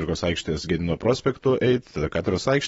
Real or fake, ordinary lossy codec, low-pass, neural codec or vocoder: fake; AAC, 24 kbps; 19.8 kHz; vocoder, 44.1 kHz, 128 mel bands every 512 samples, BigVGAN v2